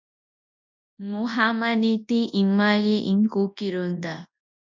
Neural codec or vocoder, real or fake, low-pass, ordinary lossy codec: codec, 24 kHz, 0.9 kbps, WavTokenizer, large speech release; fake; 7.2 kHz; MP3, 64 kbps